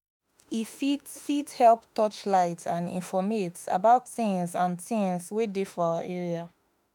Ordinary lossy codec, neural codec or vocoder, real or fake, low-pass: none; autoencoder, 48 kHz, 32 numbers a frame, DAC-VAE, trained on Japanese speech; fake; 19.8 kHz